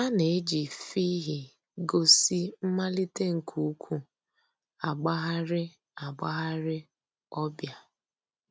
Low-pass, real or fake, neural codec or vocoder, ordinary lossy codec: none; real; none; none